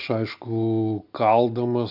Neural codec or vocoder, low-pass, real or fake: none; 5.4 kHz; real